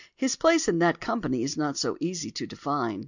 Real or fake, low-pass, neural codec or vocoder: real; 7.2 kHz; none